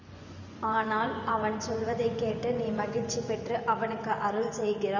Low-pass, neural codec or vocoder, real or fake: 7.2 kHz; vocoder, 24 kHz, 100 mel bands, Vocos; fake